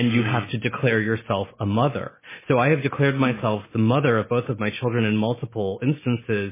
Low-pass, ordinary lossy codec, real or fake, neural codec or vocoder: 3.6 kHz; MP3, 16 kbps; real; none